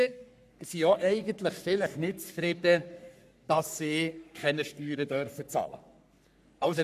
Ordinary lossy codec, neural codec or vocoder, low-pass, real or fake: none; codec, 44.1 kHz, 3.4 kbps, Pupu-Codec; 14.4 kHz; fake